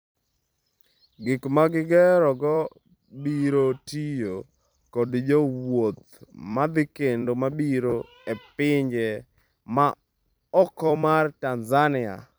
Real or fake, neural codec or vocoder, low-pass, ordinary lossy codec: fake; vocoder, 44.1 kHz, 128 mel bands every 256 samples, BigVGAN v2; none; none